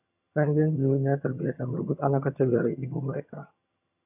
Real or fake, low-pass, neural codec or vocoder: fake; 3.6 kHz; vocoder, 22.05 kHz, 80 mel bands, HiFi-GAN